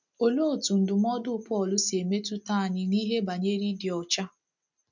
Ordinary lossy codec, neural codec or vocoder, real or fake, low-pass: none; none; real; 7.2 kHz